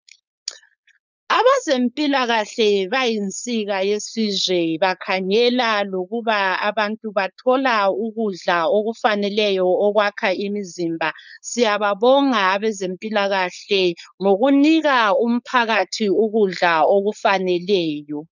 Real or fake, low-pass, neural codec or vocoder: fake; 7.2 kHz; codec, 16 kHz, 4.8 kbps, FACodec